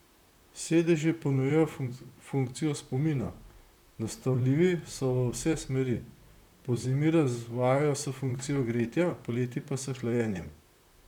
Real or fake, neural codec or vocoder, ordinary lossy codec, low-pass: fake; vocoder, 44.1 kHz, 128 mel bands, Pupu-Vocoder; none; 19.8 kHz